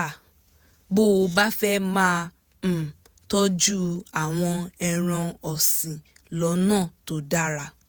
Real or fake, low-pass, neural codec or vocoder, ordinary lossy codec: fake; none; vocoder, 48 kHz, 128 mel bands, Vocos; none